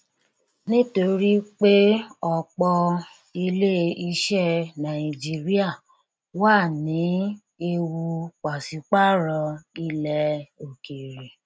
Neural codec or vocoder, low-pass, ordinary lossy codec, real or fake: none; none; none; real